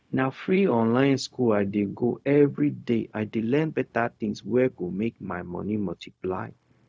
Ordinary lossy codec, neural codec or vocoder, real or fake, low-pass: none; codec, 16 kHz, 0.4 kbps, LongCat-Audio-Codec; fake; none